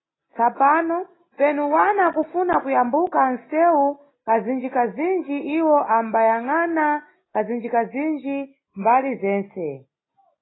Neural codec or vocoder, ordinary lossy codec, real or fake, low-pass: none; AAC, 16 kbps; real; 7.2 kHz